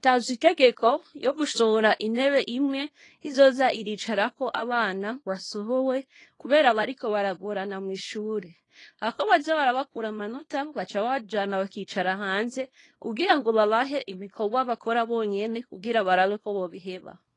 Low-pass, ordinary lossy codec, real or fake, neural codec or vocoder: 10.8 kHz; AAC, 32 kbps; fake; codec, 24 kHz, 0.9 kbps, WavTokenizer, small release